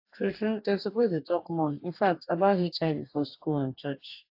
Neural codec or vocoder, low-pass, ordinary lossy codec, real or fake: codec, 44.1 kHz, 2.6 kbps, DAC; 5.4 kHz; AAC, 32 kbps; fake